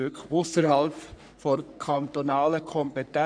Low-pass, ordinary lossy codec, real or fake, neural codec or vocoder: 9.9 kHz; none; fake; codec, 44.1 kHz, 3.4 kbps, Pupu-Codec